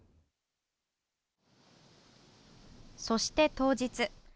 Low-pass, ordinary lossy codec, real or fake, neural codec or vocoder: none; none; real; none